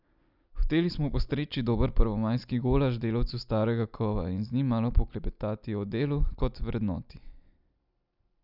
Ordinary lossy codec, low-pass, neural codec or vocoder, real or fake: none; 5.4 kHz; none; real